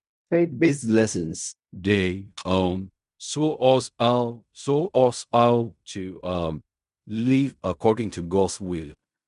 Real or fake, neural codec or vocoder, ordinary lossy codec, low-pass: fake; codec, 16 kHz in and 24 kHz out, 0.4 kbps, LongCat-Audio-Codec, fine tuned four codebook decoder; none; 10.8 kHz